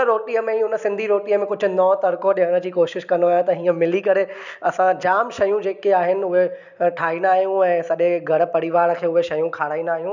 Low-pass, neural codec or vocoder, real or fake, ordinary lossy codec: 7.2 kHz; none; real; none